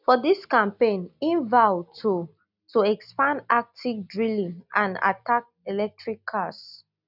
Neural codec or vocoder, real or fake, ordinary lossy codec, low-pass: none; real; none; 5.4 kHz